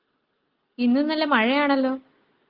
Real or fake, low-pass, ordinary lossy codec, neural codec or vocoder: real; 5.4 kHz; Opus, 24 kbps; none